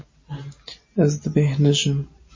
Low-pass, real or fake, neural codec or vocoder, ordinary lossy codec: 7.2 kHz; real; none; MP3, 32 kbps